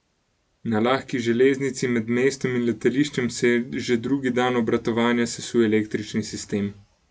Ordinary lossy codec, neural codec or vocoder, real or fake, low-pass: none; none; real; none